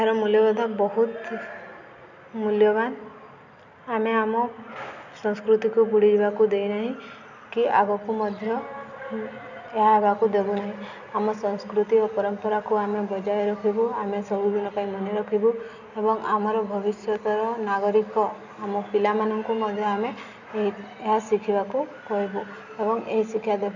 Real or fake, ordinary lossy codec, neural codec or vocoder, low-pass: real; none; none; 7.2 kHz